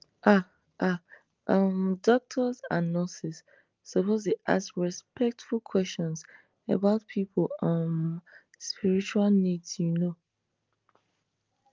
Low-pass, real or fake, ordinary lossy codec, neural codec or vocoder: 7.2 kHz; real; Opus, 24 kbps; none